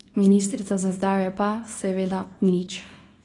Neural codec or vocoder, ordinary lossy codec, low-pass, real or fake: codec, 24 kHz, 0.9 kbps, WavTokenizer, small release; AAC, 32 kbps; 10.8 kHz; fake